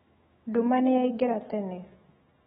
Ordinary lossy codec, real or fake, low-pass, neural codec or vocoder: AAC, 16 kbps; fake; 19.8 kHz; autoencoder, 48 kHz, 128 numbers a frame, DAC-VAE, trained on Japanese speech